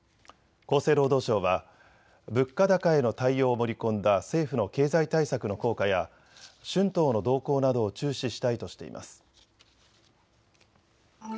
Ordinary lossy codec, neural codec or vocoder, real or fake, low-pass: none; none; real; none